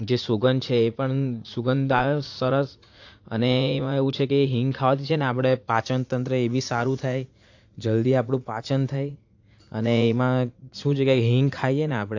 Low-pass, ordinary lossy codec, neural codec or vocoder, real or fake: 7.2 kHz; AAC, 48 kbps; vocoder, 44.1 kHz, 80 mel bands, Vocos; fake